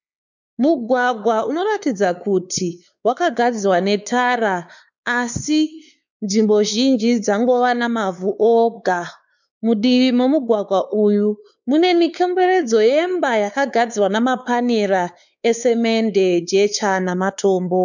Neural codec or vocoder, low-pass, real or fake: codec, 16 kHz, 4 kbps, X-Codec, WavLM features, trained on Multilingual LibriSpeech; 7.2 kHz; fake